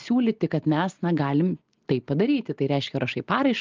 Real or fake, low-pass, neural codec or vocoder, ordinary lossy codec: real; 7.2 kHz; none; Opus, 24 kbps